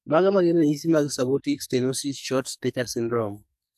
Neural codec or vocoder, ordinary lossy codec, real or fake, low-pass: codec, 44.1 kHz, 2.6 kbps, SNAC; none; fake; 14.4 kHz